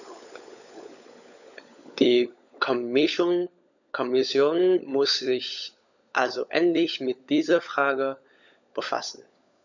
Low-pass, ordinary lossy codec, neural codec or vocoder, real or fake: 7.2 kHz; none; codec, 16 kHz, 16 kbps, FunCodec, trained on LibriTTS, 50 frames a second; fake